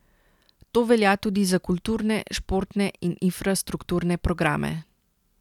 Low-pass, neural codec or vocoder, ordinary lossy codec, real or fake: 19.8 kHz; none; none; real